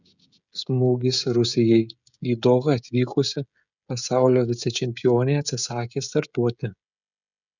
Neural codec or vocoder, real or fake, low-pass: codec, 16 kHz, 16 kbps, FreqCodec, smaller model; fake; 7.2 kHz